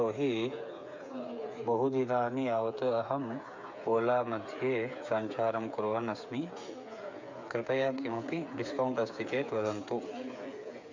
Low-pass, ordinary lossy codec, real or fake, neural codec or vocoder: 7.2 kHz; MP3, 48 kbps; fake; codec, 16 kHz, 8 kbps, FreqCodec, smaller model